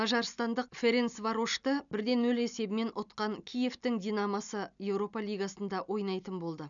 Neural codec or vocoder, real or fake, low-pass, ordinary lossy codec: none; real; 7.2 kHz; none